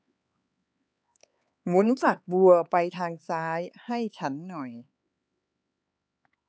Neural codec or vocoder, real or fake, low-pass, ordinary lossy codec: codec, 16 kHz, 4 kbps, X-Codec, HuBERT features, trained on LibriSpeech; fake; none; none